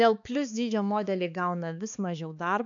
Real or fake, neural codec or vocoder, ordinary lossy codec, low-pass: fake; codec, 16 kHz, 4 kbps, X-Codec, HuBERT features, trained on balanced general audio; AAC, 64 kbps; 7.2 kHz